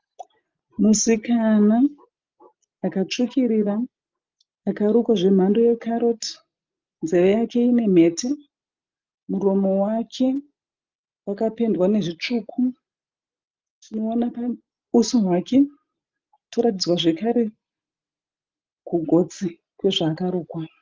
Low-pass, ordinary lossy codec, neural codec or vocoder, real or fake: 7.2 kHz; Opus, 32 kbps; none; real